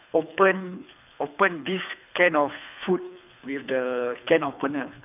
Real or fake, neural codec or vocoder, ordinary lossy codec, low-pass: fake; codec, 24 kHz, 3 kbps, HILCodec; none; 3.6 kHz